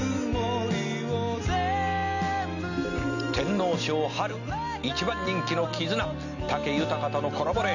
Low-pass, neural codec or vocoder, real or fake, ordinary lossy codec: 7.2 kHz; none; real; none